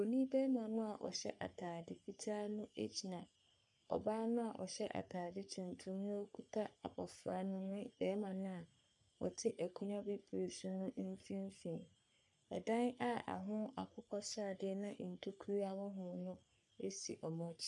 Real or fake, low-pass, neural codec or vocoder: fake; 10.8 kHz; codec, 44.1 kHz, 3.4 kbps, Pupu-Codec